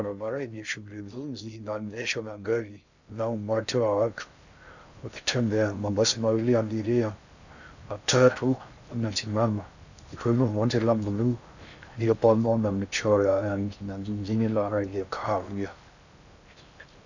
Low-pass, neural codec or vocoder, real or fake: 7.2 kHz; codec, 16 kHz in and 24 kHz out, 0.6 kbps, FocalCodec, streaming, 2048 codes; fake